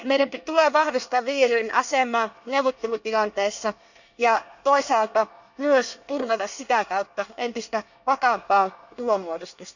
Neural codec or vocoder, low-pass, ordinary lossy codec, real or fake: codec, 24 kHz, 1 kbps, SNAC; 7.2 kHz; none; fake